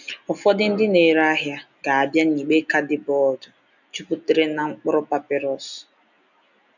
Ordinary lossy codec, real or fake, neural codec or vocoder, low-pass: none; real; none; 7.2 kHz